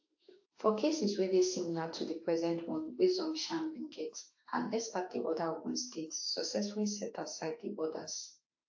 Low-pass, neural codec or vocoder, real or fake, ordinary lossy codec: 7.2 kHz; autoencoder, 48 kHz, 32 numbers a frame, DAC-VAE, trained on Japanese speech; fake; AAC, 48 kbps